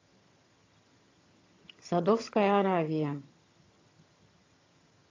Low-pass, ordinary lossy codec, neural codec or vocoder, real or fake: 7.2 kHz; AAC, 32 kbps; vocoder, 22.05 kHz, 80 mel bands, HiFi-GAN; fake